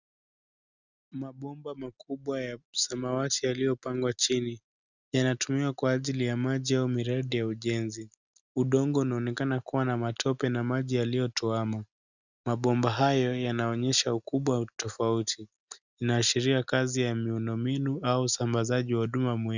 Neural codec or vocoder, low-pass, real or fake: none; 7.2 kHz; real